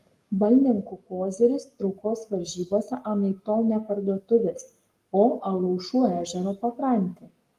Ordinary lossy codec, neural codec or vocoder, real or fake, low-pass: Opus, 32 kbps; codec, 44.1 kHz, 7.8 kbps, Pupu-Codec; fake; 14.4 kHz